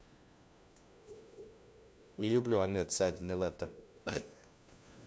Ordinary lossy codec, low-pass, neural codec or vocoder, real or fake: none; none; codec, 16 kHz, 1 kbps, FunCodec, trained on LibriTTS, 50 frames a second; fake